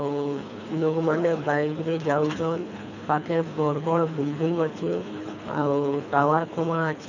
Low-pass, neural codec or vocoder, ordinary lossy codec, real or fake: 7.2 kHz; codec, 24 kHz, 3 kbps, HILCodec; none; fake